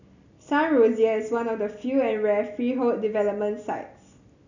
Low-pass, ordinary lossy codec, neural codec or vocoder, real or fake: 7.2 kHz; none; none; real